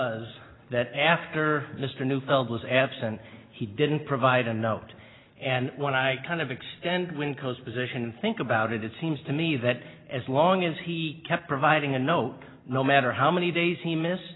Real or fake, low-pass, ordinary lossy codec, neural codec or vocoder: real; 7.2 kHz; AAC, 16 kbps; none